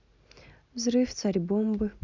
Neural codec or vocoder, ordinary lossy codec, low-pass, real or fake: none; MP3, 64 kbps; 7.2 kHz; real